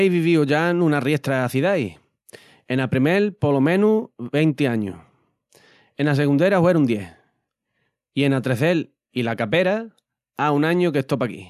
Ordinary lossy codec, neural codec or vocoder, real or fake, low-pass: none; none; real; 14.4 kHz